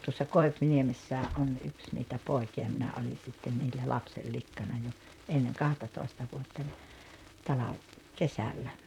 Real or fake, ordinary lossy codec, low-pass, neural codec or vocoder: fake; none; 19.8 kHz; vocoder, 44.1 kHz, 128 mel bands, Pupu-Vocoder